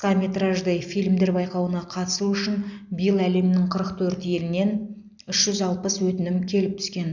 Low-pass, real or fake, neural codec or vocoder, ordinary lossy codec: 7.2 kHz; real; none; none